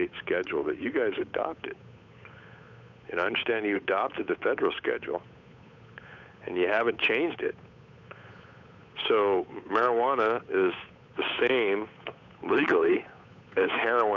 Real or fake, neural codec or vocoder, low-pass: fake; codec, 16 kHz, 8 kbps, FunCodec, trained on Chinese and English, 25 frames a second; 7.2 kHz